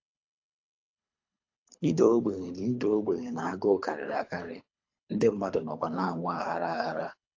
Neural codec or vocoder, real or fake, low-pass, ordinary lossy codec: codec, 24 kHz, 3 kbps, HILCodec; fake; 7.2 kHz; AAC, 48 kbps